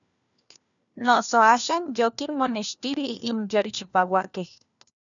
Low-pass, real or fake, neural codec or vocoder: 7.2 kHz; fake; codec, 16 kHz, 1 kbps, FunCodec, trained on LibriTTS, 50 frames a second